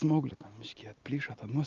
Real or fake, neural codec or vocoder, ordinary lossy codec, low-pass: real; none; Opus, 32 kbps; 7.2 kHz